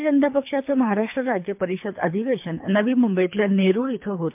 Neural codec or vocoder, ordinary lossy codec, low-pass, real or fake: codec, 24 kHz, 3 kbps, HILCodec; MP3, 32 kbps; 3.6 kHz; fake